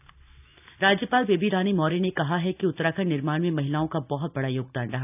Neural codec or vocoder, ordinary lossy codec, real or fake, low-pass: none; none; real; 3.6 kHz